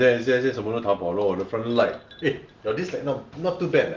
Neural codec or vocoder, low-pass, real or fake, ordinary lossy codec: none; 7.2 kHz; real; Opus, 32 kbps